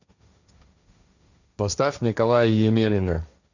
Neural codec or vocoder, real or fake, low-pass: codec, 16 kHz, 1.1 kbps, Voila-Tokenizer; fake; 7.2 kHz